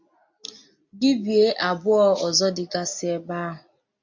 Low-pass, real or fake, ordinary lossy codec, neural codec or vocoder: 7.2 kHz; real; MP3, 48 kbps; none